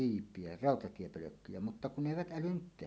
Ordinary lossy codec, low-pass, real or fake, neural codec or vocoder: none; none; real; none